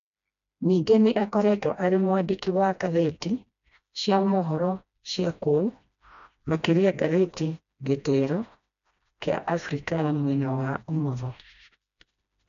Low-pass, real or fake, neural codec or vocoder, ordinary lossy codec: 7.2 kHz; fake; codec, 16 kHz, 1 kbps, FreqCodec, smaller model; none